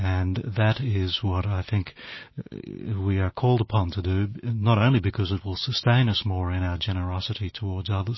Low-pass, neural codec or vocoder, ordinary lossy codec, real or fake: 7.2 kHz; none; MP3, 24 kbps; real